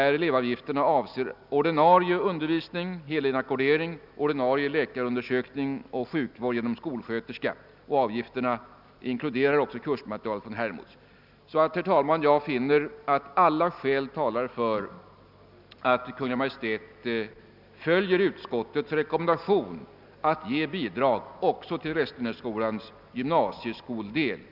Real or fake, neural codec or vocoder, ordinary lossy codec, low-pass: real; none; none; 5.4 kHz